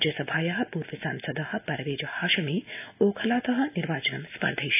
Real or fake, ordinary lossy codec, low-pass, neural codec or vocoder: real; AAC, 32 kbps; 3.6 kHz; none